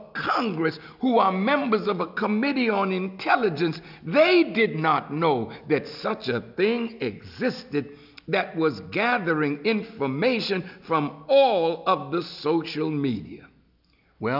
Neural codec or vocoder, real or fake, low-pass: none; real; 5.4 kHz